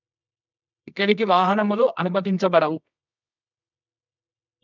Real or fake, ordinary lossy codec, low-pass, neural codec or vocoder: fake; none; 7.2 kHz; codec, 24 kHz, 0.9 kbps, WavTokenizer, medium music audio release